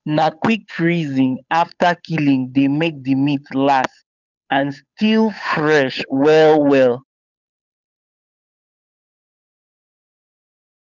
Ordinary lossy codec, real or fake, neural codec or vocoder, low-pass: none; fake; codec, 16 kHz, 8 kbps, FunCodec, trained on Chinese and English, 25 frames a second; 7.2 kHz